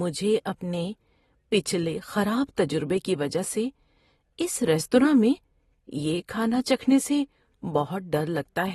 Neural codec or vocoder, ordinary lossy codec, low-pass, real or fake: none; AAC, 32 kbps; 19.8 kHz; real